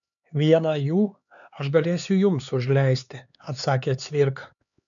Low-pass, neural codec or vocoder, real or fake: 7.2 kHz; codec, 16 kHz, 4 kbps, X-Codec, HuBERT features, trained on LibriSpeech; fake